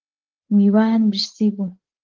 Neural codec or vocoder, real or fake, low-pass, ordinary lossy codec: codec, 16 kHz, 8 kbps, FreqCodec, larger model; fake; 7.2 kHz; Opus, 16 kbps